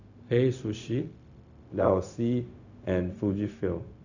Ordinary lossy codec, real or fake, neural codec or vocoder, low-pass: none; fake; codec, 16 kHz, 0.4 kbps, LongCat-Audio-Codec; 7.2 kHz